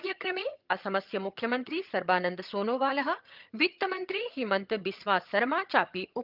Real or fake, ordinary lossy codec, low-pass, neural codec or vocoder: fake; Opus, 24 kbps; 5.4 kHz; vocoder, 22.05 kHz, 80 mel bands, HiFi-GAN